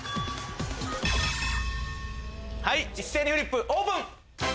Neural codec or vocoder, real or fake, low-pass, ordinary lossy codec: none; real; none; none